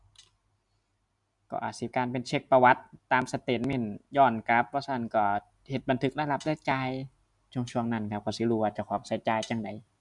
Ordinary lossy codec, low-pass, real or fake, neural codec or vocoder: MP3, 96 kbps; 10.8 kHz; real; none